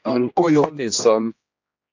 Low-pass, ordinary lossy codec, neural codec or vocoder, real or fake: 7.2 kHz; AAC, 48 kbps; codec, 16 kHz, 1 kbps, X-Codec, HuBERT features, trained on general audio; fake